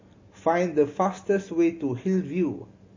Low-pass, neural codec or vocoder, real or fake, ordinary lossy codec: 7.2 kHz; none; real; MP3, 32 kbps